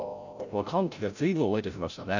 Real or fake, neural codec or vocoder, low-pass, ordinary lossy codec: fake; codec, 16 kHz, 0.5 kbps, FreqCodec, larger model; 7.2 kHz; none